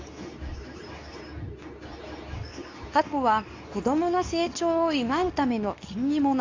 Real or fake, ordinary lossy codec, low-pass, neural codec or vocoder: fake; AAC, 48 kbps; 7.2 kHz; codec, 24 kHz, 0.9 kbps, WavTokenizer, medium speech release version 2